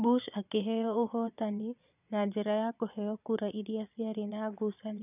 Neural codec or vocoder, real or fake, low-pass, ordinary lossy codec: vocoder, 22.05 kHz, 80 mel bands, Vocos; fake; 3.6 kHz; none